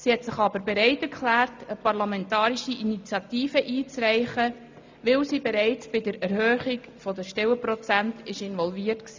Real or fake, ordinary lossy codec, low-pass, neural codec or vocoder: real; MP3, 64 kbps; 7.2 kHz; none